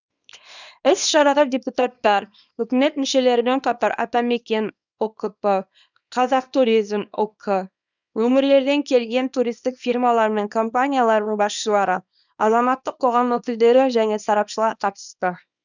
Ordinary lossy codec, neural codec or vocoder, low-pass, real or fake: none; codec, 24 kHz, 0.9 kbps, WavTokenizer, small release; 7.2 kHz; fake